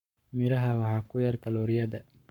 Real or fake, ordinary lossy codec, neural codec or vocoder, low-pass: fake; none; codec, 44.1 kHz, 7.8 kbps, Pupu-Codec; 19.8 kHz